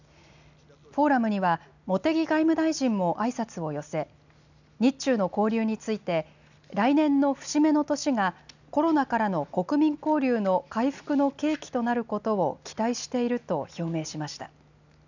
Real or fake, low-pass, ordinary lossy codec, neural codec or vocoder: real; 7.2 kHz; none; none